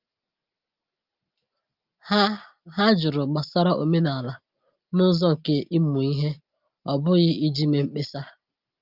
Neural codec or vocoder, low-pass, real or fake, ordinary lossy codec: none; 5.4 kHz; real; Opus, 32 kbps